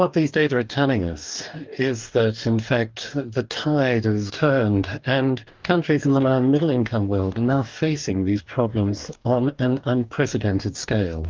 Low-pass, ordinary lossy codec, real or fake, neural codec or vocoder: 7.2 kHz; Opus, 32 kbps; fake; codec, 44.1 kHz, 2.6 kbps, DAC